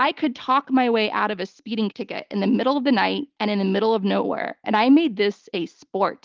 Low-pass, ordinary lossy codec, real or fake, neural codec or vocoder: 7.2 kHz; Opus, 24 kbps; real; none